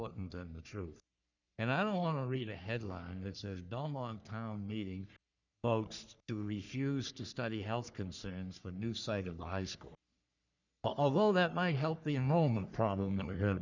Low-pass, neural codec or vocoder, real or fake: 7.2 kHz; codec, 44.1 kHz, 3.4 kbps, Pupu-Codec; fake